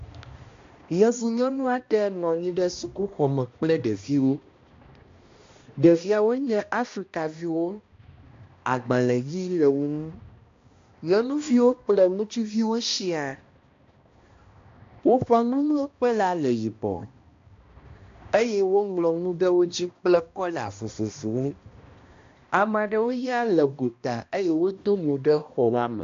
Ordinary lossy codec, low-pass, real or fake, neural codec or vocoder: AAC, 48 kbps; 7.2 kHz; fake; codec, 16 kHz, 1 kbps, X-Codec, HuBERT features, trained on balanced general audio